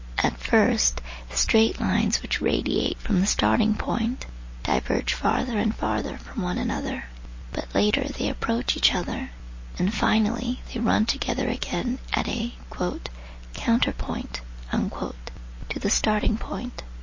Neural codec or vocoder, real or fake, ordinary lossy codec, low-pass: none; real; MP3, 32 kbps; 7.2 kHz